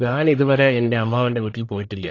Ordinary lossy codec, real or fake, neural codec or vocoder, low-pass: AAC, 32 kbps; fake; codec, 44.1 kHz, 1.7 kbps, Pupu-Codec; 7.2 kHz